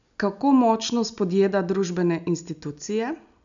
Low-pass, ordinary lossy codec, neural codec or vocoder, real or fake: 7.2 kHz; none; none; real